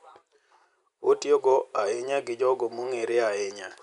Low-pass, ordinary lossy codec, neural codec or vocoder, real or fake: 10.8 kHz; none; none; real